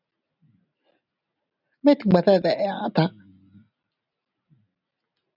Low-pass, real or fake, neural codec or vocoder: 5.4 kHz; real; none